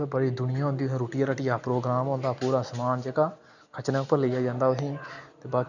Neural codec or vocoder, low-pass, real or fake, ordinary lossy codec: none; 7.2 kHz; real; none